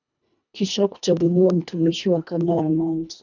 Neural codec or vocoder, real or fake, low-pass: codec, 24 kHz, 1.5 kbps, HILCodec; fake; 7.2 kHz